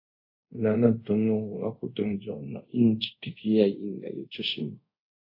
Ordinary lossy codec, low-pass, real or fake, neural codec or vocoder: AAC, 32 kbps; 5.4 kHz; fake; codec, 24 kHz, 0.5 kbps, DualCodec